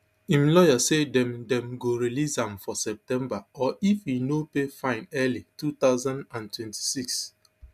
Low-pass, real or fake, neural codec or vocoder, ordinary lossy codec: 14.4 kHz; real; none; MP3, 96 kbps